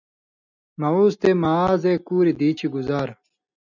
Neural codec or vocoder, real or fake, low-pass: none; real; 7.2 kHz